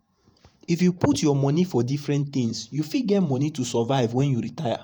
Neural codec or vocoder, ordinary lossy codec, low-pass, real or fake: vocoder, 48 kHz, 128 mel bands, Vocos; none; none; fake